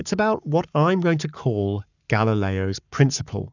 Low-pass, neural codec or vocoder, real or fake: 7.2 kHz; codec, 44.1 kHz, 7.8 kbps, Pupu-Codec; fake